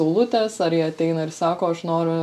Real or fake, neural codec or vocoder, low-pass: real; none; 14.4 kHz